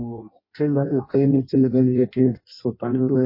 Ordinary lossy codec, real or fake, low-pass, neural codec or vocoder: MP3, 24 kbps; fake; 5.4 kHz; codec, 16 kHz in and 24 kHz out, 0.6 kbps, FireRedTTS-2 codec